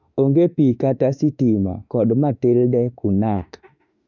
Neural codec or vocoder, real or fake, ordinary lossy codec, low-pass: autoencoder, 48 kHz, 32 numbers a frame, DAC-VAE, trained on Japanese speech; fake; none; 7.2 kHz